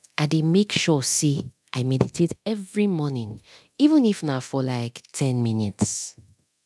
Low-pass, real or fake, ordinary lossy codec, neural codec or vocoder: none; fake; none; codec, 24 kHz, 0.9 kbps, DualCodec